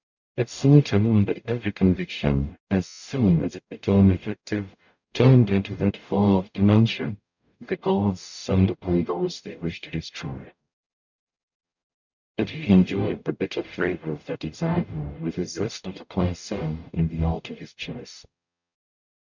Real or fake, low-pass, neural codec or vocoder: fake; 7.2 kHz; codec, 44.1 kHz, 0.9 kbps, DAC